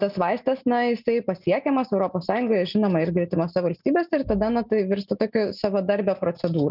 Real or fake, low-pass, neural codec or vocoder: real; 5.4 kHz; none